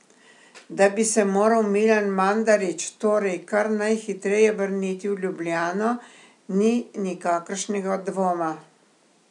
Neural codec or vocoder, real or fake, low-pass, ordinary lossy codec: none; real; 10.8 kHz; none